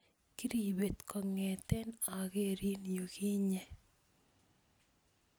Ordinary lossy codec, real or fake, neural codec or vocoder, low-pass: none; real; none; none